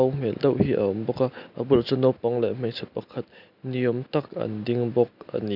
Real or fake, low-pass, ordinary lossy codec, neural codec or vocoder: real; 5.4 kHz; none; none